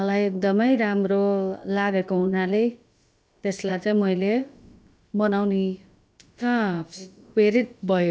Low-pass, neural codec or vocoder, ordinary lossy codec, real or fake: none; codec, 16 kHz, about 1 kbps, DyCAST, with the encoder's durations; none; fake